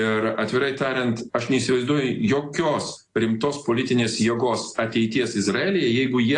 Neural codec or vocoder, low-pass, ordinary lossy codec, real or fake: none; 10.8 kHz; AAC, 48 kbps; real